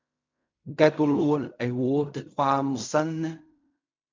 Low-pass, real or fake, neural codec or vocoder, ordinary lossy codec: 7.2 kHz; fake; codec, 16 kHz in and 24 kHz out, 0.4 kbps, LongCat-Audio-Codec, fine tuned four codebook decoder; MP3, 64 kbps